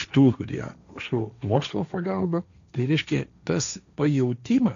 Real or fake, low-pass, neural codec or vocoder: fake; 7.2 kHz; codec, 16 kHz, 1.1 kbps, Voila-Tokenizer